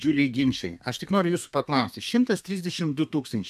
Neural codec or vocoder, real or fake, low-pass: codec, 32 kHz, 1.9 kbps, SNAC; fake; 14.4 kHz